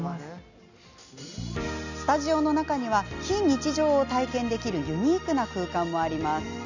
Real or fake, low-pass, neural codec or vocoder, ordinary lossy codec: real; 7.2 kHz; none; none